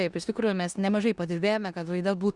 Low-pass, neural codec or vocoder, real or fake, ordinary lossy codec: 10.8 kHz; codec, 16 kHz in and 24 kHz out, 0.9 kbps, LongCat-Audio-Codec, four codebook decoder; fake; Opus, 64 kbps